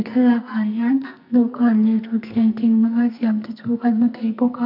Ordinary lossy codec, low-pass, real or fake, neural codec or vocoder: none; 5.4 kHz; fake; codec, 44.1 kHz, 2.6 kbps, SNAC